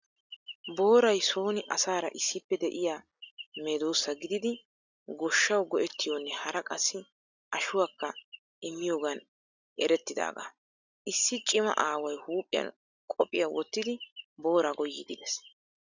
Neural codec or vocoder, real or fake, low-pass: none; real; 7.2 kHz